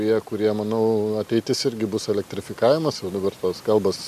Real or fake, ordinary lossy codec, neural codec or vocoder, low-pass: real; MP3, 96 kbps; none; 14.4 kHz